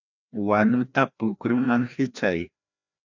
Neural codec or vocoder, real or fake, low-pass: codec, 16 kHz, 1 kbps, FreqCodec, larger model; fake; 7.2 kHz